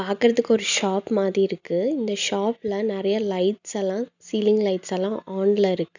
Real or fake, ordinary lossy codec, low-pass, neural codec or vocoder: real; none; 7.2 kHz; none